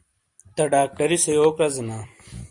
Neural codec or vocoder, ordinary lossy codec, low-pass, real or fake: vocoder, 44.1 kHz, 128 mel bands every 256 samples, BigVGAN v2; Opus, 64 kbps; 10.8 kHz; fake